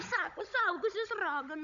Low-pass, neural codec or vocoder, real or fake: 7.2 kHz; codec, 16 kHz, 16 kbps, FunCodec, trained on Chinese and English, 50 frames a second; fake